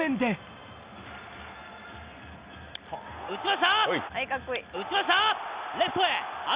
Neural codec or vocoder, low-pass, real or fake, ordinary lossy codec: none; 3.6 kHz; real; Opus, 64 kbps